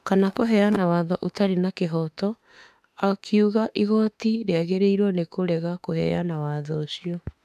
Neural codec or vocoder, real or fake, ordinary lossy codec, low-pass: autoencoder, 48 kHz, 32 numbers a frame, DAC-VAE, trained on Japanese speech; fake; MP3, 96 kbps; 14.4 kHz